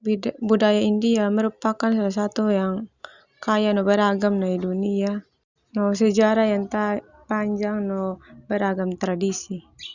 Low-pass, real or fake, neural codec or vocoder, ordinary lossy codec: 7.2 kHz; real; none; Opus, 64 kbps